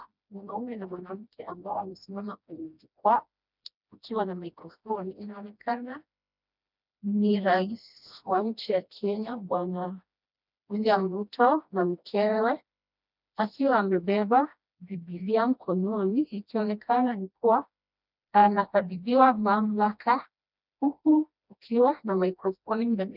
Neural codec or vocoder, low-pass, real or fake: codec, 16 kHz, 1 kbps, FreqCodec, smaller model; 5.4 kHz; fake